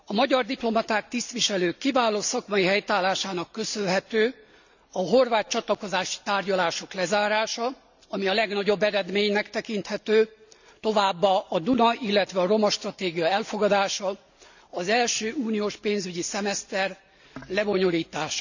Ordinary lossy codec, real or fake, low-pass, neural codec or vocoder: none; real; 7.2 kHz; none